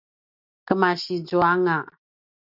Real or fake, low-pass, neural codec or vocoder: real; 5.4 kHz; none